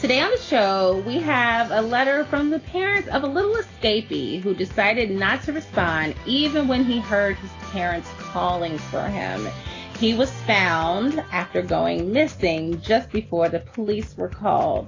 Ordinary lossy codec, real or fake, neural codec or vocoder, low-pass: AAC, 32 kbps; real; none; 7.2 kHz